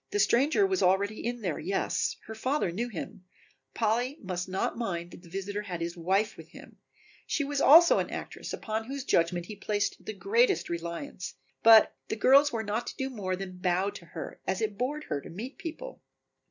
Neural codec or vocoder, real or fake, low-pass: none; real; 7.2 kHz